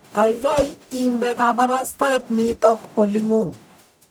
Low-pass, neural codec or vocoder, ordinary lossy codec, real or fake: none; codec, 44.1 kHz, 0.9 kbps, DAC; none; fake